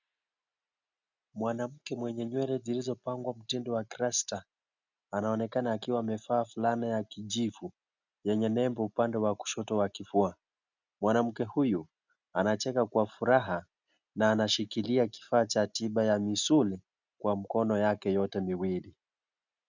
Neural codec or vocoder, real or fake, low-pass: none; real; 7.2 kHz